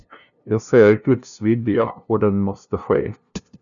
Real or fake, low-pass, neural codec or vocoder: fake; 7.2 kHz; codec, 16 kHz, 0.5 kbps, FunCodec, trained on LibriTTS, 25 frames a second